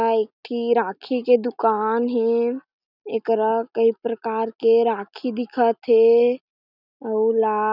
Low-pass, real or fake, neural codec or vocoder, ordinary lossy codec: 5.4 kHz; real; none; none